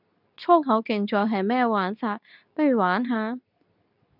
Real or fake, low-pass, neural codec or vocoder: fake; 5.4 kHz; codec, 24 kHz, 0.9 kbps, WavTokenizer, medium speech release version 2